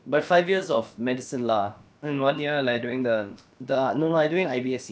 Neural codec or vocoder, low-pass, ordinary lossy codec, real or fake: codec, 16 kHz, about 1 kbps, DyCAST, with the encoder's durations; none; none; fake